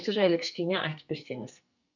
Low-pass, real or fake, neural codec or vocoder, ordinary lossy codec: 7.2 kHz; fake; codec, 44.1 kHz, 2.6 kbps, SNAC; none